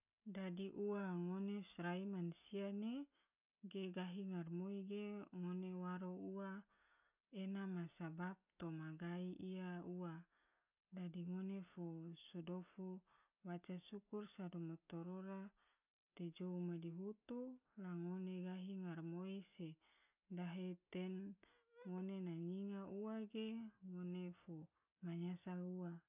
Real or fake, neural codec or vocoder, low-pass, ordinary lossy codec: real; none; 3.6 kHz; none